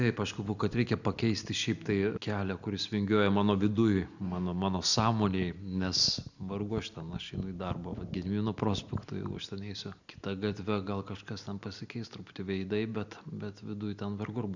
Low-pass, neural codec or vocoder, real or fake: 7.2 kHz; none; real